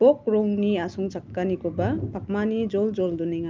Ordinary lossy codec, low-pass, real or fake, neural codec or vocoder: Opus, 24 kbps; 7.2 kHz; real; none